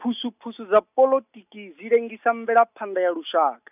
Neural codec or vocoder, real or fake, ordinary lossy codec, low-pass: none; real; none; 3.6 kHz